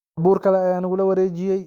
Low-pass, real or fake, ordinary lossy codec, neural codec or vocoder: 19.8 kHz; fake; Opus, 64 kbps; autoencoder, 48 kHz, 128 numbers a frame, DAC-VAE, trained on Japanese speech